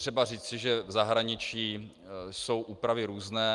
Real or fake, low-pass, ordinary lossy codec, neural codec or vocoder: real; 10.8 kHz; Opus, 32 kbps; none